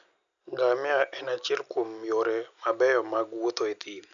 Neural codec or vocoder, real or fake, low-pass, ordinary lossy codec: none; real; 7.2 kHz; none